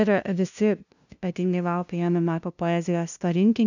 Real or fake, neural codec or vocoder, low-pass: fake; codec, 16 kHz, 0.5 kbps, FunCodec, trained on LibriTTS, 25 frames a second; 7.2 kHz